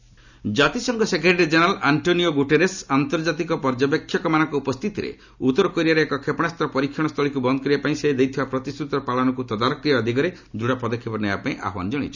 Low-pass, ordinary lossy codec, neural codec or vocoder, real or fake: 7.2 kHz; none; none; real